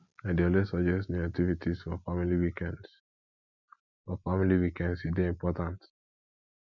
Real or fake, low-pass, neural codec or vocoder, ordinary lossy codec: real; 7.2 kHz; none; none